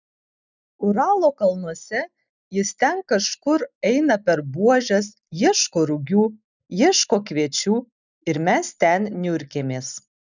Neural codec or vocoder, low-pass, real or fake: none; 7.2 kHz; real